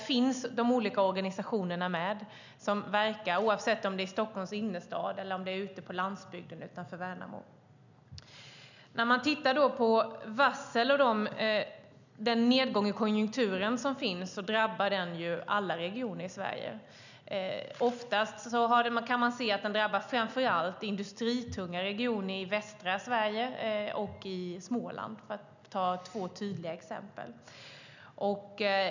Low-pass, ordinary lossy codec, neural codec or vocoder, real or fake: 7.2 kHz; none; none; real